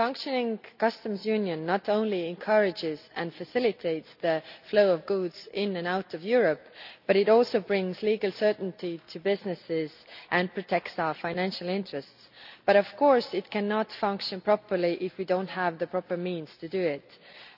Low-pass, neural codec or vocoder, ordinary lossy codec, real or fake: 5.4 kHz; none; none; real